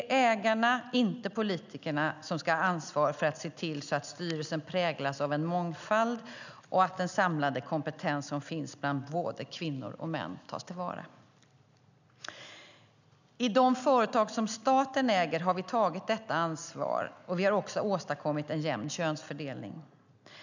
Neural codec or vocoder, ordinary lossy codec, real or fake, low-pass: none; none; real; 7.2 kHz